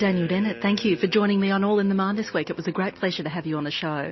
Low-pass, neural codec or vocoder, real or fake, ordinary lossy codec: 7.2 kHz; none; real; MP3, 24 kbps